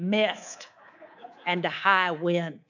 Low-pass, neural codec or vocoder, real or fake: 7.2 kHz; codec, 24 kHz, 3.1 kbps, DualCodec; fake